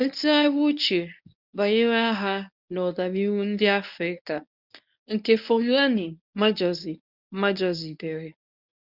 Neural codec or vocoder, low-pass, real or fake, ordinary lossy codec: codec, 24 kHz, 0.9 kbps, WavTokenizer, medium speech release version 1; 5.4 kHz; fake; none